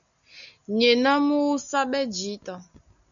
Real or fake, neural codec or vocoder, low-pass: real; none; 7.2 kHz